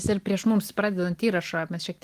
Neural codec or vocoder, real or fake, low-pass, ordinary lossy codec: none; real; 14.4 kHz; Opus, 24 kbps